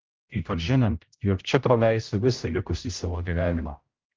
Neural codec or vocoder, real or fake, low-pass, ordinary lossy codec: codec, 16 kHz, 0.5 kbps, X-Codec, HuBERT features, trained on general audio; fake; 7.2 kHz; Opus, 24 kbps